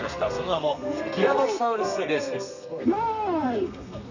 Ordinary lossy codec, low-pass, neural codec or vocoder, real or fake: none; 7.2 kHz; codec, 32 kHz, 1.9 kbps, SNAC; fake